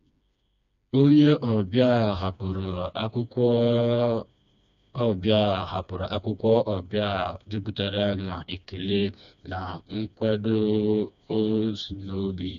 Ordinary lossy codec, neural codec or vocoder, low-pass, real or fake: none; codec, 16 kHz, 2 kbps, FreqCodec, smaller model; 7.2 kHz; fake